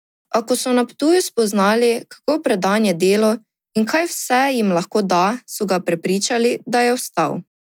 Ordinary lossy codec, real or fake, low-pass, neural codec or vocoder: none; real; none; none